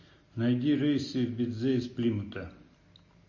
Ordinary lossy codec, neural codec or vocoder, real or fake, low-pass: MP3, 32 kbps; none; real; 7.2 kHz